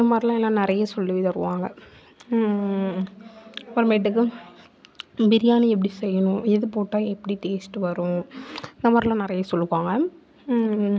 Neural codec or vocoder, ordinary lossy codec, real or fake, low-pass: none; none; real; none